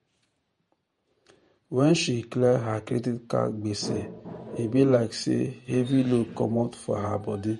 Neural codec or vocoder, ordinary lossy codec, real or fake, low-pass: vocoder, 48 kHz, 128 mel bands, Vocos; MP3, 48 kbps; fake; 19.8 kHz